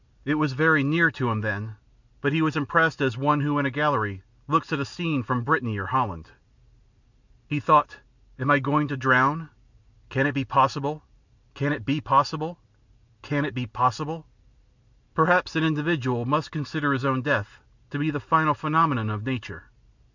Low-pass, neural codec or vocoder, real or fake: 7.2 kHz; none; real